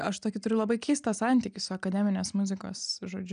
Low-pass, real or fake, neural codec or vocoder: 9.9 kHz; real; none